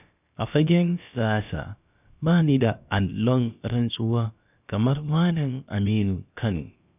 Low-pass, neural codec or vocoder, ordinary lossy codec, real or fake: 3.6 kHz; codec, 16 kHz, about 1 kbps, DyCAST, with the encoder's durations; none; fake